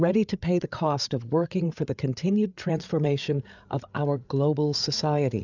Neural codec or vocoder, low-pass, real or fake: codec, 16 kHz, 8 kbps, FreqCodec, larger model; 7.2 kHz; fake